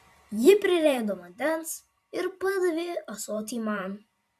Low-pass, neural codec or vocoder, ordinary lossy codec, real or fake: 14.4 kHz; vocoder, 44.1 kHz, 128 mel bands every 512 samples, BigVGAN v2; MP3, 96 kbps; fake